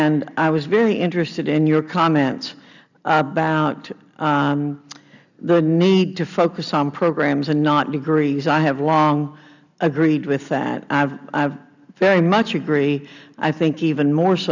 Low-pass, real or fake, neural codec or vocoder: 7.2 kHz; real; none